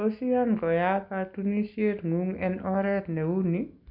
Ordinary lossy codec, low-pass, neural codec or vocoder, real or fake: none; 5.4 kHz; none; real